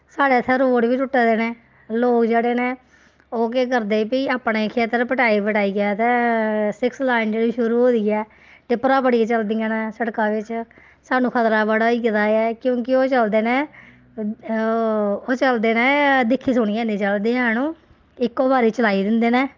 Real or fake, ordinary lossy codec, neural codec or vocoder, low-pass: real; Opus, 24 kbps; none; 7.2 kHz